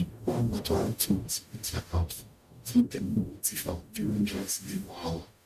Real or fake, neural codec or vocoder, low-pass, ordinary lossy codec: fake; codec, 44.1 kHz, 0.9 kbps, DAC; 14.4 kHz; none